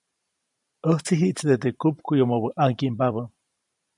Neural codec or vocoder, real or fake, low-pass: vocoder, 44.1 kHz, 128 mel bands every 512 samples, BigVGAN v2; fake; 10.8 kHz